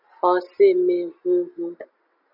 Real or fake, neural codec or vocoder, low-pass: real; none; 5.4 kHz